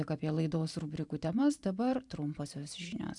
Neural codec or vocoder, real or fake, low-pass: vocoder, 44.1 kHz, 128 mel bands every 512 samples, BigVGAN v2; fake; 10.8 kHz